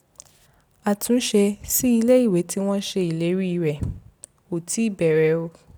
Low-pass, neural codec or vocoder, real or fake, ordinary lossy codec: none; none; real; none